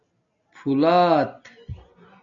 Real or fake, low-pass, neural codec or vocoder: real; 7.2 kHz; none